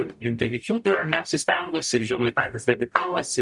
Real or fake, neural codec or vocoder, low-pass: fake; codec, 44.1 kHz, 0.9 kbps, DAC; 10.8 kHz